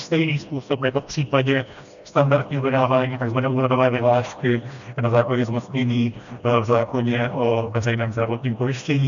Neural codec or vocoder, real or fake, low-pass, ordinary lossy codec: codec, 16 kHz, 1 kbps, FreqCodec, smaller model; fake; 7.2 kHz; MP3, 96 kbps